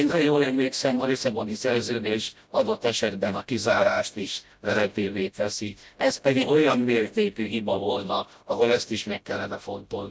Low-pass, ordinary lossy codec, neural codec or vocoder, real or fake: none; none; codec, 16 kHz, 0.5 kbps, FreqCodec, smaller model; fake